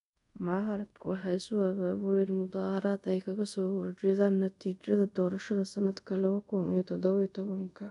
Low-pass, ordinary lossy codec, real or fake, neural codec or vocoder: 10.8 kHz; none; fake; codec, 24 kHz, 0.5 kbps, DualCodec